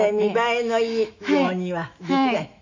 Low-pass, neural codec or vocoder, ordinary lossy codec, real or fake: 7.2 kHz; autoencoder, 48 kHz, 128 numbers a frame, DAC-VAE, trained on Japanese speech; MP3, 64 kbps; fake